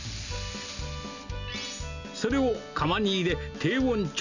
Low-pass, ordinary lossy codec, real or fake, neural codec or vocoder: 7.2 kHz; none; real; none